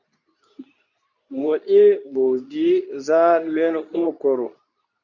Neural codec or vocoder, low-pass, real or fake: codec, 24 kHz, 0.9 kbps, WavTokenizer, medium speech release version 2; 7.2 kHz; fake